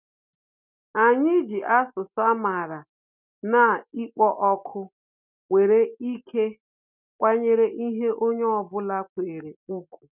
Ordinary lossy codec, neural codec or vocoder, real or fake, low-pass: none; none; real; 3.6 kHz